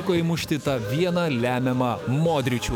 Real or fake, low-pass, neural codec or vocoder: fake; 19.8 kHz; autoencoder, 48 kHz, 128 numbers a frame, DAC-VAE, trained on Japanese speech